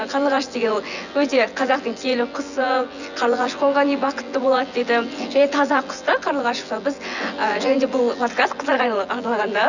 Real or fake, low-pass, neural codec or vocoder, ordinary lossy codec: fake; 7.2 kHz; vocoder, 24 kHz, 100 mel bands, Vocos; none